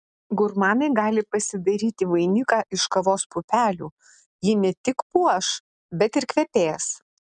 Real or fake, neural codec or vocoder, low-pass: real; none; 9.9 kHz